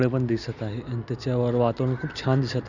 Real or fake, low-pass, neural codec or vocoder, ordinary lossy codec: real; 7.2 kHz; none; none